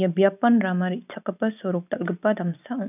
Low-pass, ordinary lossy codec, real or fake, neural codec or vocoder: 3.6 kHz; none; real; none